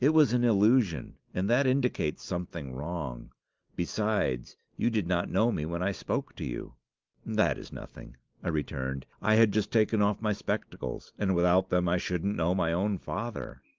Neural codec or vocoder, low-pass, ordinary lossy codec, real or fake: none; 7.2 kHz; Opus, 24 kbps; real